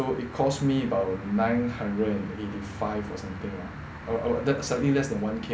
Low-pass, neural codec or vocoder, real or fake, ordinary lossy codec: none; none; real; none